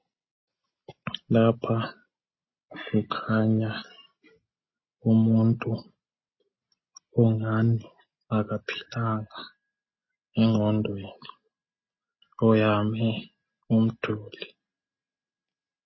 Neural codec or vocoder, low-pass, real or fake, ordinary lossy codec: none; 7.2 kHz; real; MP3, 24 kbps